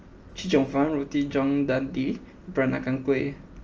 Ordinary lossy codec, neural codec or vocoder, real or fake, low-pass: Opus, 24 kbps; none; real; 7.2 kHz